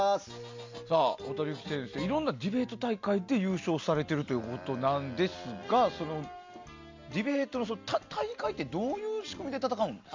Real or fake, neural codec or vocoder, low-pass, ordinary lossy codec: real; none; 7.2 kHz; MP3, 64 kbps